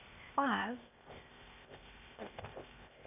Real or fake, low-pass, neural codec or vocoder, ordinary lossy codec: fake; 3.6 kHz; codec, 16 kHz, 0.8 kbps, ZipCodec; none